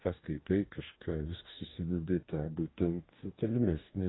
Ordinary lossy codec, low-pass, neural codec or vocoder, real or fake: AAC, 16 kbps; 7.2 kHz; codec, 44.1 kHz, 2.6 kbps, DAC; fake